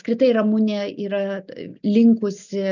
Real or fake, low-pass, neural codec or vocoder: real; 7.2 kHz; none